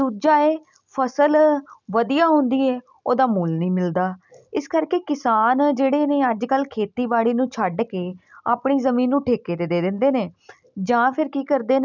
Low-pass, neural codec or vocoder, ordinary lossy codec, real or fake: 7.2 kHz; none; none; real